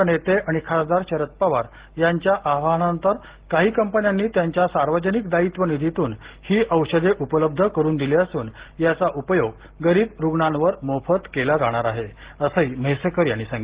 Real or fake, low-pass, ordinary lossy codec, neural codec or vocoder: real; 3.6 kHz; Opus, 16 kbps; none